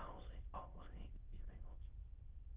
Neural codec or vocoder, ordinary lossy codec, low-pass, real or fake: autoencoder, 22.05 kHz, a latent of 192 numbers a frame, VITS, trained on many speakers; Opus, 32 kbps; 3.6 kHz; fake